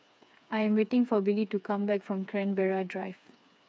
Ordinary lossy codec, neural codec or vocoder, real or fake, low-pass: none; codec, 16 kHz, 4 kbps, FreqCodec, smaller model; fake; none